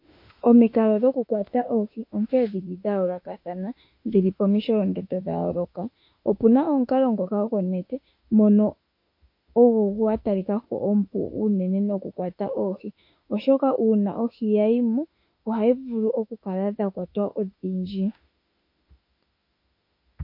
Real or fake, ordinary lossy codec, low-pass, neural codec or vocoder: fake; MP3, 32 kbps; 5.4 kHz; autoencoder, 48 kHz, 32 numbers a frame, DAC-VAE, trained on Japanese speech